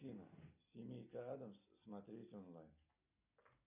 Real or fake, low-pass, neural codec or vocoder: real; 3.6 kHz; none